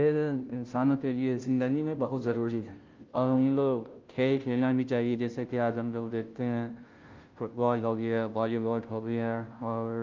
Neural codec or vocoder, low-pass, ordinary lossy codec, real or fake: codec, 16 kHz, 0.5 kbps, FunCodec, trained on Chinese and English, 25 frames a second; 7.2 kHz; Opus, 32 kbps; fake